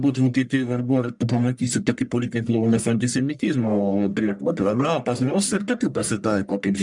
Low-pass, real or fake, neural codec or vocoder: 10.8 kHz; fake; codec, 44.1 kHz, 1.7 kbps, Pupu-Codec